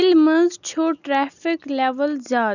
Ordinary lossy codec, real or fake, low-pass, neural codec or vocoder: none; real; 7.2 kHz; none